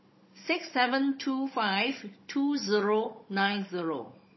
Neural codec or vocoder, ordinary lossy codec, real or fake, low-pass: codec, 16 kHz, 16 kbps, FunCodec, trained on Chinese and English, 50 frames a second; MP3, 24 kbps; fake; 7.2 kHz